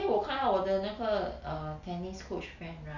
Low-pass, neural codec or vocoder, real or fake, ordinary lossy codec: 7.2 kHz; none; real; none